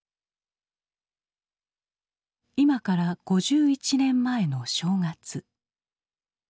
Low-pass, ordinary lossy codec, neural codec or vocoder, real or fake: none; none; none; real